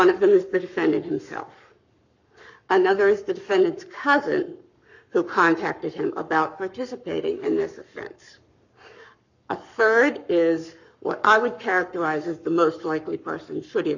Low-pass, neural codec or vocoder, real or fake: 7.2 kHz; codec, 44.1 kHz, 7.8 kbps, Pupu-Codec; fake